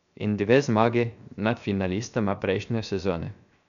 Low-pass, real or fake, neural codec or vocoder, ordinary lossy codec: 7.2 kHz; fake; codec, 16 kHz, 0.7 kbps, FocalCodec; none